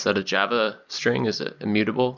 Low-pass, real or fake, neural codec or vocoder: 7.2 kHz; real; none